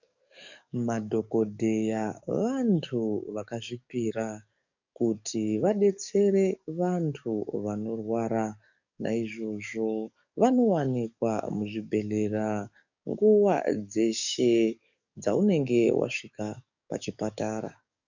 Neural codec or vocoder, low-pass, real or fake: codec, 44.1 kHz, 7.8 kbps, DAC; 7.2 kHz; fake